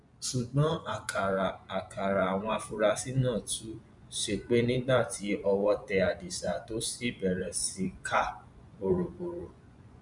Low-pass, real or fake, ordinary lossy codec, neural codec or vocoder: 10.8 kHz; fake; none; vocoder, 24 kHz, 100 mel bands, Vocos